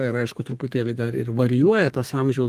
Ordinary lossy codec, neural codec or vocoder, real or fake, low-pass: Opus, 32 kbps; codec, 32 kHz, 1.9 kbps, SNAC; fake; 14.4 kHz